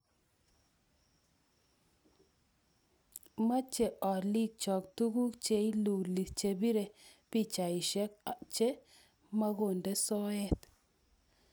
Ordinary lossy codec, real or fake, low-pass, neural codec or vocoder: none; real; none; none